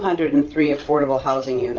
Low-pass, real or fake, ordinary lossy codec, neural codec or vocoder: 7.2 kHz; fake; Opus, 32 kbps; codec, 16 kHz, 8 kbps, FreqCodec, larger model